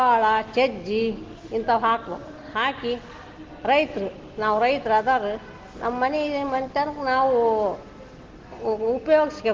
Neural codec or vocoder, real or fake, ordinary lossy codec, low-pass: none; real; Opus, 16 kbps; 7.2 kHz